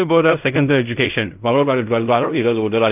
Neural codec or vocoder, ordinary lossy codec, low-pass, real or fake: codec, 16 kHz in and 24 kHz out, 0.4 kbps, LongCat-Audio-Codec, fine tuned four codebook decoder; none; 3.6 kHz; fake